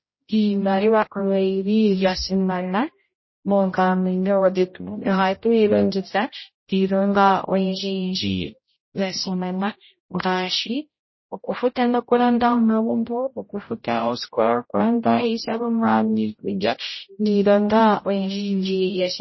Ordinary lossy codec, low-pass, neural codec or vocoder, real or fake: MP3, 24 kbps; 7.2 kHz; codec, 16 kHz, 0.5 kbps, X-Codec, HuBERT features, trained on general audio; fake